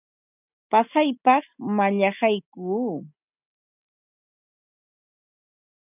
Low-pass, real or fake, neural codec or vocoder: 3.6 kHz; real; none